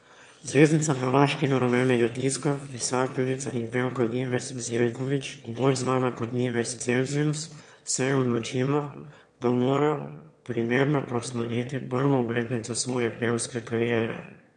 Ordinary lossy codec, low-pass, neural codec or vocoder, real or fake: MP3, 64 kbps; 9.9 kHz; autoencoder, 22.05 kHz, a latent of 192 numbers a frame, VITS, trained on one speaker; fake